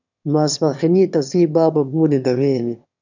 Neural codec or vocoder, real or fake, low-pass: autoencoder, 22.05 kHz, a latent of 192 numbers a frame, VITS, trained on one speaker; fake; 7.2 kHz